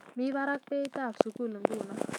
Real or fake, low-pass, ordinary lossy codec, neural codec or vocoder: fake; 19.8 kHz; none; autoencoder, 48 kHz, 128 numbers a frame, DAC-VAE, trained on Japanese speech